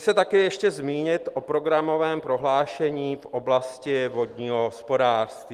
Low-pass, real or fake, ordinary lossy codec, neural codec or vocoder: 14.4 kHz; real; Opus, 24 kbps; none